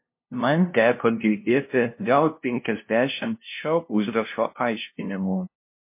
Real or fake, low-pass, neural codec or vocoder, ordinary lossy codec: fake; 3.6 kHz; codec, 16 kHz, 0.5 kbps, FunCodec, trained on LibriTTS, 25 frames a second; MP3, 24 kbps